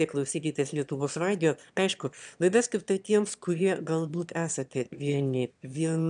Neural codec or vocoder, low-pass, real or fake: autoencoder, 22.05 kHz, a latent of 192 numbers a frame, VITS, trained on one speaker; 9.9 kHz; fake